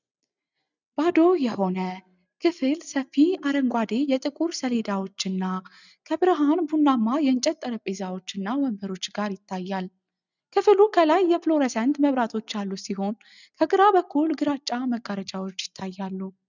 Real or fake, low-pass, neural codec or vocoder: real; 7.2 kHz; none